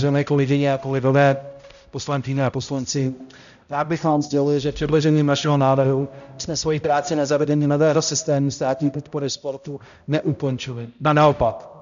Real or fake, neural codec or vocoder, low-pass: fake; codec, 16 kHz, 0.5 kbps, X-Codec, HuBERT features, trained on balanced general audio; 7.2 kHz